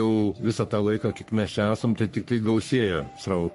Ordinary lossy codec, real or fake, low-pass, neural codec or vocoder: MP3, 48 kbps; fake; 14.4 kHz; codec, 44.1 kHz, 3.4 kbps, Pupu-Codec